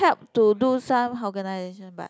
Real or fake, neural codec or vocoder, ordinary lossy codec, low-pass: real; none; none; none